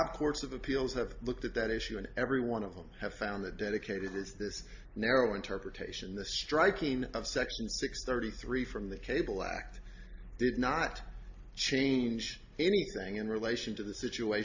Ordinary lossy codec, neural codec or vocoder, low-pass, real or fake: AAC, 48 kbps; none; 7.2 kHz; real